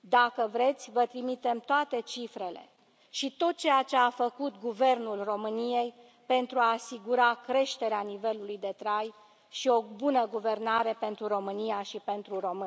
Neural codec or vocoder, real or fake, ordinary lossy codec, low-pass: none; real; none; none